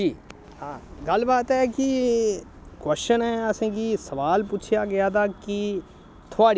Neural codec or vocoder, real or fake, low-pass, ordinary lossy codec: none; real; none; none